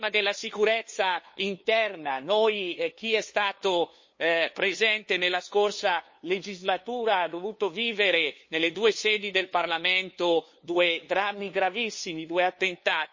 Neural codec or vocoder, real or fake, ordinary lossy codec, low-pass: codec, 16 kHz, 2 kbps, FunCodec, trained on LibriTTS, 25 frames a second; fake; MP3, 32 kbps; 7.2 kHz